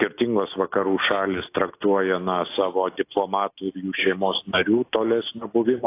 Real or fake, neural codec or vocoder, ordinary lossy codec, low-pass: real; none; AAC, 24 kbps; 3.6 kHz